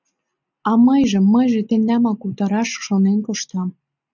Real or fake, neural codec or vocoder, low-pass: real; none; 7.2 kHz